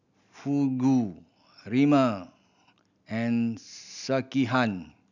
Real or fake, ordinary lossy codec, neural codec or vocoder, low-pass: real; none; none; 7.2 kHz